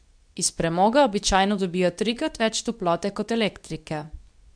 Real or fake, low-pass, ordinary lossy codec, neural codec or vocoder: fake; 9.9 kHz; none; codec, 24 kHz, 0.9 kbps, WavTokenizer, medium speech release version 2